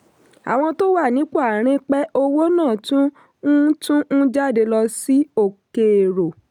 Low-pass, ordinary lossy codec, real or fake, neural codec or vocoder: 19.8 kHz; none; real; none